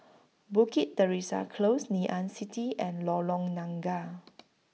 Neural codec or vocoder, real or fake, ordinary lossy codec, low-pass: none; real; none; none